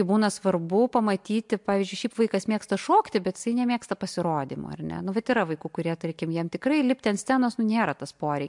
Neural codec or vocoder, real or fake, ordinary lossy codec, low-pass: none; real; MP3, 64 kbps; 10.8 kHz